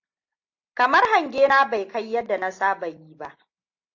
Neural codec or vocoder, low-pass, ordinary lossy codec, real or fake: none; 7.2 kHz; AAC, 48 kbps; real